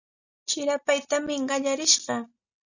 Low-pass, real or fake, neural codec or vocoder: 7.2 kHz; real; none